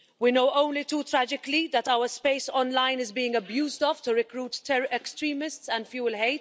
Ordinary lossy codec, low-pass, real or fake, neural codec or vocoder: none; none; real; none